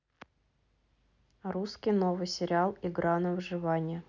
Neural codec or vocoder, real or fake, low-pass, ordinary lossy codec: none; real; 7.2 kHz; none